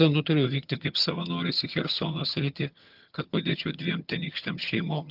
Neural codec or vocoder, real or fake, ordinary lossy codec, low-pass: vocoder, 22.05 kHz, 80 mel bands, HiFi-GAN; fake; Opus, 24 kbps; 5.4 kHz